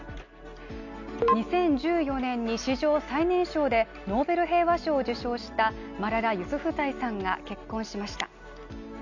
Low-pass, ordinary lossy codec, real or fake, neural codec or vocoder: 7.2 kHz; none; real; none